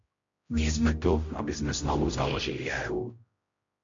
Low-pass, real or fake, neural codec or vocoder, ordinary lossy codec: 7.2 kHz; fake; codec, 16 kHz, 0.5 kbps, X-Codec, HuBERT features, trained on general audio; MP3, 48 kbps